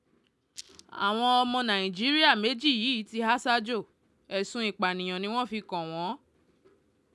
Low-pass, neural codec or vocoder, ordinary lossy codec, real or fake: none; none; none; real